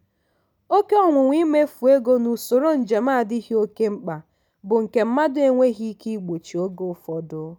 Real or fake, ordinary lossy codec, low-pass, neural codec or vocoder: real; none; none; none